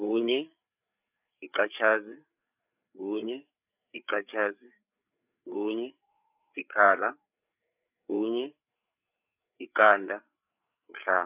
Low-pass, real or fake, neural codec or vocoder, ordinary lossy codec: 3.6 kHz; fake; codec, 16 kHz, 8 kbps, FreqCodec, larger model; none